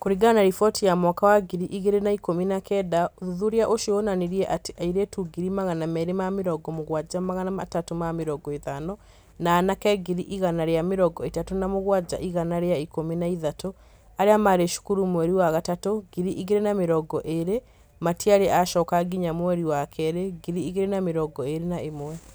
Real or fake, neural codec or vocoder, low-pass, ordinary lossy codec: real; none; none; none